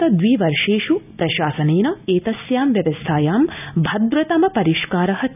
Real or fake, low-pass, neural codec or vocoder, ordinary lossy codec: real; 3.6 kHz; none; none